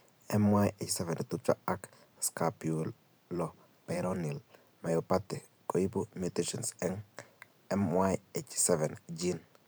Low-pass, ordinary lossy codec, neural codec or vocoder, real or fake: none; none; vocoder, 44.1 kHz, 128 mel bands every 512 samples, BigVGAN v2; fake